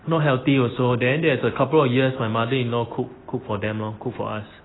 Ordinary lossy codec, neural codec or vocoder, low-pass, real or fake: AAC, 16 kbps; none; 7.2 kHz; real